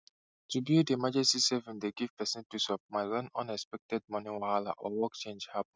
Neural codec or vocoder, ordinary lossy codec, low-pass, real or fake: none; none; none; real